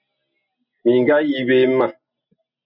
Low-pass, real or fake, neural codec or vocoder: 5.4 kHz; real; none